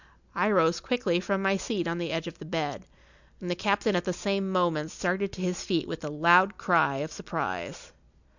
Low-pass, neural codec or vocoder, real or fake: 7.2 kHz; none; real